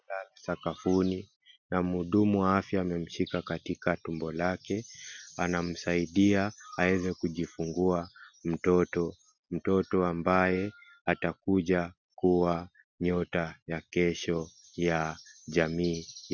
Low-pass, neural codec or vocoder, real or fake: 7.2 kHz; none; real